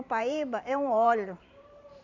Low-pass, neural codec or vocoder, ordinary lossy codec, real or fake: 7.2 kHz; vocoder, 44.1 kHz, 128 mel bands every 512 samples, BigVGAN v2; none; fake